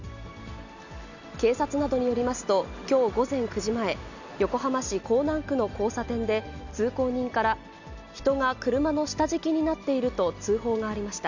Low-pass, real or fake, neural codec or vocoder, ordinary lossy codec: 7.2 kHz; real; none; none